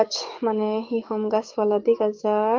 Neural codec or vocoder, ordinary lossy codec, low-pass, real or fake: codec, 44.1 kHz, 7.8 kbps, DAC; Opus, 32 kbps; 7.2 kHz; fake